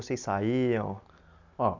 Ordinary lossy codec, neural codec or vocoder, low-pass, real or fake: MP3, 64 kbps; none; 7.2 kHz; real